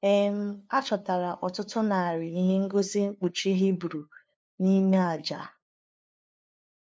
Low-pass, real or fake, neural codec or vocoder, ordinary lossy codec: none; fake; codec, 16 kHz, 2 kbps, FunCodec, trained on LibriTTS, 25 frames a second; none